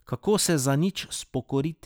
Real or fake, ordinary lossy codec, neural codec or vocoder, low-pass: real; none; none; none